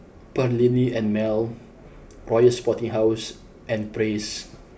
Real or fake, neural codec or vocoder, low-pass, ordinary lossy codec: real; none; none; none